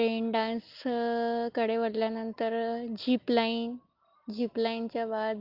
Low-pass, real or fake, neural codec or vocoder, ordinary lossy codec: 5.4 kHz; real; none; Opus, 32 kbps